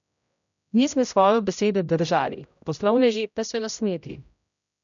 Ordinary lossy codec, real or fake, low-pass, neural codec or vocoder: none; fake; 7.2 kHz; codec, 16 kHz, 0.5 kbps, X-Codec, HuBERT features, trained on general audio